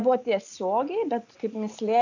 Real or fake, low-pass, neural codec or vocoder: real; 7.2 kHz; none